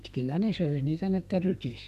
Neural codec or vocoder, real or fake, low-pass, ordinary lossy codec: codec, 32 kHz, 1.9 kbps, SNAC; fake; 14.4 kHz; none